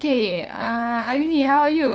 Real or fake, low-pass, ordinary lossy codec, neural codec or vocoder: fake; none; none; codec, 16 kHz, 2 kbps, FunCodec, trained on LibriTTS, 25 frames a second